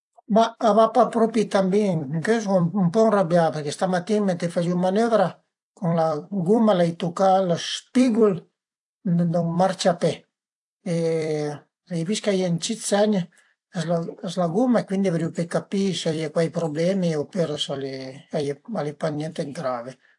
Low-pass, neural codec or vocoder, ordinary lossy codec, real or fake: 10.8 kHz; vocoder, 44.1 kHz, 128 mel bands every 256 samples, BigVGAN v2; AAC, 64 kbps; fake